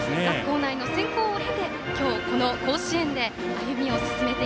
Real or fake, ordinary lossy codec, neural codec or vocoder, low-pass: real; none; none; none